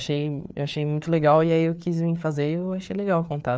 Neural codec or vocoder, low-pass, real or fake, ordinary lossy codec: codec, 16 kHz, 4 kbps, FreqCodec, larger model; none; fake; none